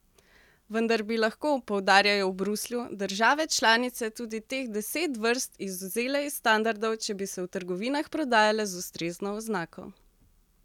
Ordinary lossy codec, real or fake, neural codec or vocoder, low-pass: none; real; none; 19.8 kHz